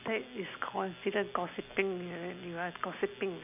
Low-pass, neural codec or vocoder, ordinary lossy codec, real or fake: 3.6 kHz; none; none; real